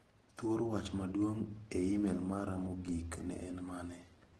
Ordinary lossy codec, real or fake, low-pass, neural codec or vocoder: Opus, 16 kbps; real; 10.8 kHz; none